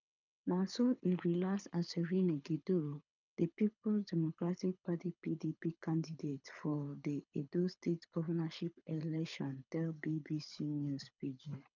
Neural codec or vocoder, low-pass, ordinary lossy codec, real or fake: codec, 24 kHz, 6 kbps, HILCodec; 7.2 kHz; none; fake